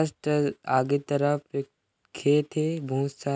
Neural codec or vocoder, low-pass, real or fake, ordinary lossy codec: none; none; real; none